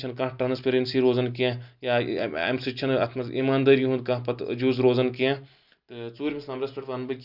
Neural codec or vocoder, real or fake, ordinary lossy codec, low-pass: none; real; Opus, 64 kbps; 5.4 kHz